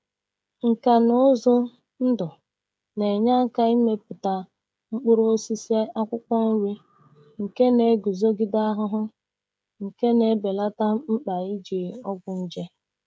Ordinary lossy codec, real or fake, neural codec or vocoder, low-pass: none; fake; codec, 16 kHz, 16 kbps, FreqCodec, smaller model; none